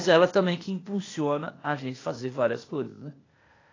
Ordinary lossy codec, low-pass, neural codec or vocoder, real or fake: AAC, 32 kbps; 7.2 kHz; codec, 16 kHz, 0.8 kbps, ZipCodec; fake